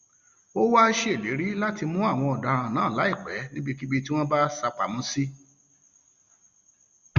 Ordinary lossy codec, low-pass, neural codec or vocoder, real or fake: Opus, 64 kbps; 7.2 kHz; none; real